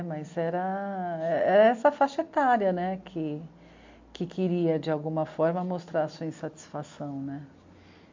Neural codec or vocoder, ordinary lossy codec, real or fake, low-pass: none; MP3, 48 kbps; real; 7.2 kHz